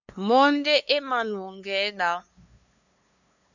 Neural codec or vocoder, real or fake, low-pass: codec, 16 kHz, 2 kbps, FunCodec, trained on LibriTTS, 25 frames a second; fake; 7.2 kHz